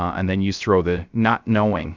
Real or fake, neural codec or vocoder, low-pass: fake; codec, 16 kHz, 0.3 kbps, FocalCodec; 7.2 kHz